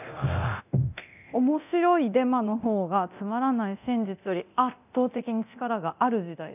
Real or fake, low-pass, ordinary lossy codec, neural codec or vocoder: fake; 3.6 kHz; none; codec, 24 kHz, 0.9 kbps, DualCodec